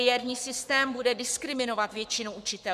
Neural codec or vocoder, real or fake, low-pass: codec, 44.1 kHz, 7.8 kbps, Pupu-Codec; fake; 14.4 kHz